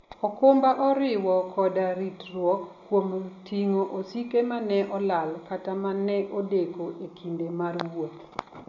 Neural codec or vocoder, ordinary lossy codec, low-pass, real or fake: none; none; 7.2 kHz; real